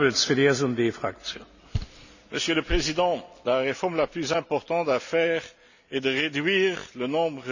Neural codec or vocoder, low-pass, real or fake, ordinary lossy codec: none; 7.2 kHz; real; none